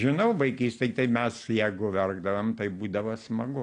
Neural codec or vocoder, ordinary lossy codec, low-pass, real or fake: none; AAC, 48 kbps; 9.9 kHz; real